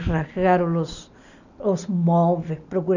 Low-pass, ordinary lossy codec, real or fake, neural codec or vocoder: 7.2 kHz; none; real; none